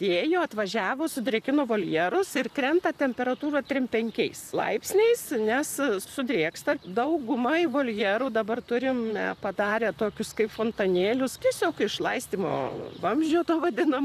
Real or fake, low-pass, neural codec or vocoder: fake; 14.4 kHz; vocoder, 44.1 kHz, 128 mel bands, Pupu-Vocoder